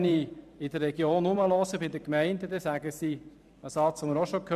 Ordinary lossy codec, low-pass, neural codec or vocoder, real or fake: none; 14.4 kHz; vocoder, 44.1 kHz, 128 mel bands every 512 samples, BigVGAN v2; fake